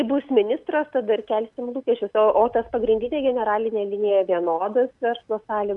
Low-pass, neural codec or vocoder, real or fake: 10.8 kHz; none; real